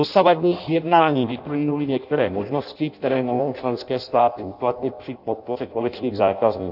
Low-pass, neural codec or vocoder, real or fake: 5.4 kHz; codec, 16 kHz in and 24 kHz out, 0.6 kbps, FireRedTTS-2 codec; fake